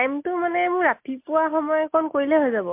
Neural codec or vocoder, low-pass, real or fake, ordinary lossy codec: none; 3.6 kHz; real; MP3, 32 kbps